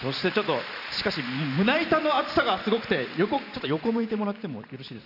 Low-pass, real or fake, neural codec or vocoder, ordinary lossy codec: 5.4 kHz; fake; vocoder, 44.1 kHz, 128 mel bands every 512 samples, BigVGAN v2; none